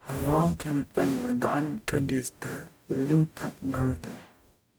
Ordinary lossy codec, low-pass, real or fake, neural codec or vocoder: none; none; fake; codec, 44.1 kHz, 0.9 kbps, DAC